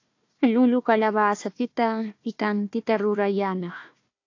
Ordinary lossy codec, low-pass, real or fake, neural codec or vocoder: AAC, 48 kbps; 7.2 kHz; fake; codec, 16 kHz, 1 kbps, FunCodec, trained on Chinese and English, 50 frames a second